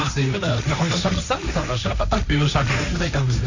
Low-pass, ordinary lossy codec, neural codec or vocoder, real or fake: 7.2 kHz; none; codec, 16 kHz, 1.1 kbps, Voila-Tokenizer; fake